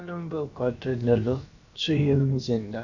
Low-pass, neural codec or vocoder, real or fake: 7.2 kHz; codec, 16 kHz, about 1 kbps, DyCAST, with the encoder's durations; fake